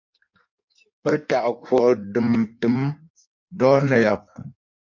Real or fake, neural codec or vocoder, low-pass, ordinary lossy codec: fake; codec, 16 kHz in and 24 kHz out, 1.1 kbps, FireRedTTS-2 codec; 7.2 kHz; MP3, 48 kbps